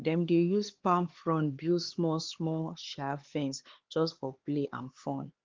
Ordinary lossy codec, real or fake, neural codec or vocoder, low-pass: Opus, 16 kbps; fake; codec, 16 kHz, 4 kbps, X-Codec, HuBERT features, trained on LibriSpeech; 7.2 kHz